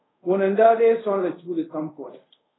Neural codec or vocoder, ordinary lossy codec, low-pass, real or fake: codec, 24 kHz, 0.5 kbps, DualCodec; AAC, 16 kbps; 7.2 kHz; fake